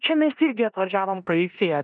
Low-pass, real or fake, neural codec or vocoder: 9.9 kHz; fake; codec, 16 kHz in and 24 kHz out, 0.9 kbps, LongCat-Audio-Codec, four codebook decoder